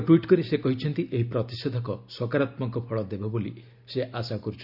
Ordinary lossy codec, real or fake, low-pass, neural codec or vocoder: Opus, 64 kbps; real; 5.4 kHz; none